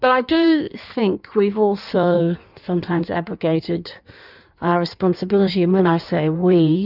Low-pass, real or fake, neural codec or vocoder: 5.4 kHz; fake; codec, 16 kHz in and 24 kHz out, 1.1 kbps, FireRedTTS-2 codec